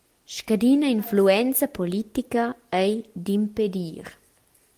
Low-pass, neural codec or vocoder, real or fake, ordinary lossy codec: 14.4 kHz; none; real; Opus, 24 kbps